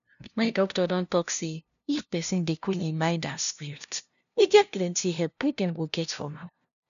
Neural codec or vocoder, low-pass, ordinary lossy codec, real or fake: codec, 16 kHz, 0.5 kbps, FunCodec, trained on LibriTTS, 25 frames a second; 7.2 kHz; none; fake